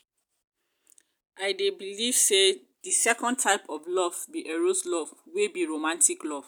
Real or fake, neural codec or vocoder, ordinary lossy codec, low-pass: real; none; none; none